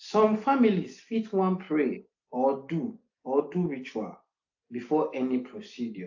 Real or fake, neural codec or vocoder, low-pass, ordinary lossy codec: real; none; 7.2 kHz; AAC, 48 kbps